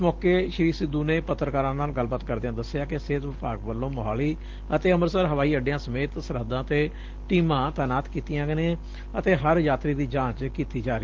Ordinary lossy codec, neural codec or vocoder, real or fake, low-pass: Opus, 16 kbps; none; real; 7.2 kHz